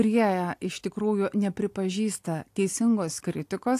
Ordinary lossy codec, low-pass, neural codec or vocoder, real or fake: AAC, 64 kbps; 14.4 kHz; none; real